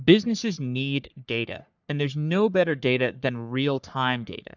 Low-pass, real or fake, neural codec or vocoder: 7.2 kHz; fake; codec, 44.1 kHz, 3.4 kbps, Pupu-Codec